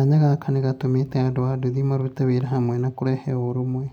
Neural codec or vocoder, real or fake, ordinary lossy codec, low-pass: none; real; none; 19.8 kHz